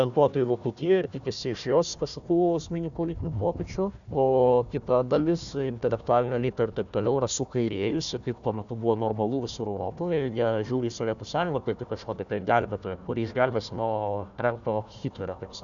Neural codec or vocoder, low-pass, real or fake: codec, 16 kHz, 1 kbps, FunCodec, trained on Chinese and English, 50 frames a second; 7.2 kHz; fake